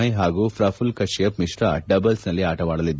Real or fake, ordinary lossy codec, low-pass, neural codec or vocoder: real; none; none; none